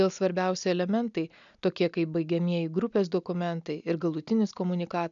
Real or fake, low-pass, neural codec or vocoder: real; 7.2 kHz; none